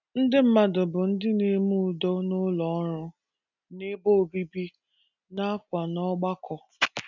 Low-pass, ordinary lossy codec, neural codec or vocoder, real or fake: 7.2 kHz; none; none; real